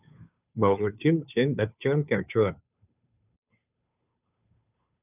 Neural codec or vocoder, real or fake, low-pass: codec, 16 kHz, 2 kbps, FunCodec, trained on Chinese and English, 25 frames a second; fake; 3.6 kHz